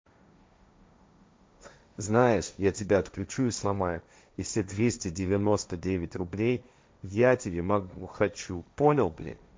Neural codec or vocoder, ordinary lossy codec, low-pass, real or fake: codec, 16 kHz, 1.1 kbps, Voila-Tokenizer; none; none; fake